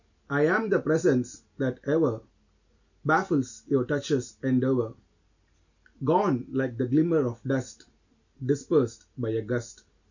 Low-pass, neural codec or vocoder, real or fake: 7.2 kHz; none; real